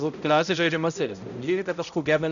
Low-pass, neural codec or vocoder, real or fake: 7.2 kHz; codec, 16 kHz, 0.5 kbps, X-Codec, HuBERT features, trained on balanced general audio; fake